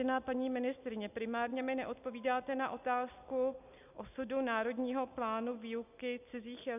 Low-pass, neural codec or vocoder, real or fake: 3.6 kHz; none; real